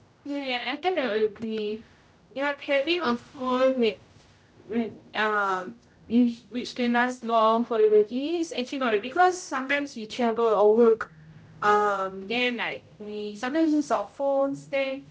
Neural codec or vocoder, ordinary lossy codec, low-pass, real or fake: codec, 16 kHz, 0.5 kbps, X-Codec, HuBERT features, trained on general audio; none; none; fake